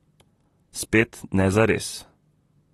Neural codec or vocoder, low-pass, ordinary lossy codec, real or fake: vocoder, 44.1 kHz, 128 mel bands, Pupu-Vocoder; 19.8 kHz; AAC, 32 kbps; fake